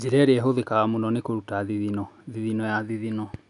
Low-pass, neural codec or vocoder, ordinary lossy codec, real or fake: 10.8 kHz; none; none; real